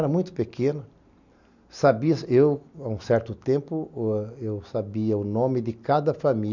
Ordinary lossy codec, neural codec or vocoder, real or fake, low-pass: none; none; real; 7.2 kHz